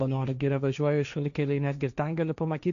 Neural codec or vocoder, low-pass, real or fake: codec, 16 kHz, 1.1 kbps, Voila-Tokenizer; 7.2 kHz; fake